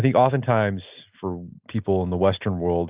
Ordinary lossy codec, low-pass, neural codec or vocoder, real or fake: Opus, 24 kbps; 3.6 kHz; none; real